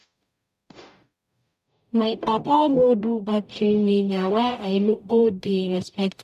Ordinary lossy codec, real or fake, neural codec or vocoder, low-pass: none; fake; codec, 44.1 kHz, 0.9 kbps, DAC; 14.4 kHz